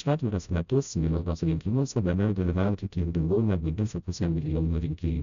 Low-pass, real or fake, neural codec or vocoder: 7.2 kHz; fake; codec, 16 kHz, 0.5 kbps, FreqCodec, smaller model